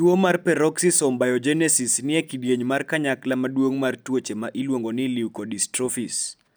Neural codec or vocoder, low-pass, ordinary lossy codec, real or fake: none; none; none; real